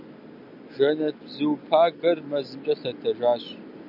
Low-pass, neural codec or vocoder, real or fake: 5.4 kHz; none; real